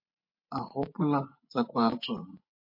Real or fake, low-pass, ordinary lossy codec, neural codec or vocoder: fake; 5.4 kHz; MP3, 32 kbps; vocoder, 24 kHz, 100 mel bands, Vocos